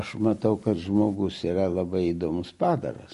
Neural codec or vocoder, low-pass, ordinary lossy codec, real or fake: none; 14.4 kHz; MP3, 48 kbps; real